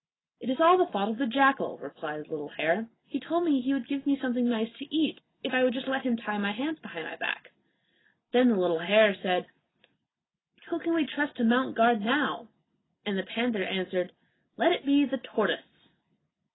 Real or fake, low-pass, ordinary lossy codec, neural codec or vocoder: real; 7.2 kHz; AAC, 16 kbps; none